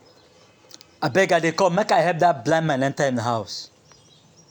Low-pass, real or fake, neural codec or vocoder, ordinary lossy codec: 19.8 kHz; real; none; none